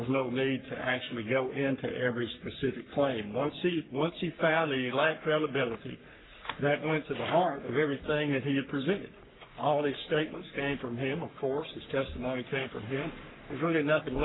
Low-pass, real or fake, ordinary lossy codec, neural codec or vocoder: 7.2 kHz; fake; AAC, 16 kbps; codec, 44.1 kHz, 3.4 kbps, Pupu-Codec